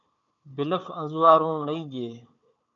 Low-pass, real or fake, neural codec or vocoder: 7.2 kHz; fake; codec, 16 kHz, 4 kbps, FunCodec, trained on Chinese and English, 50 frames a second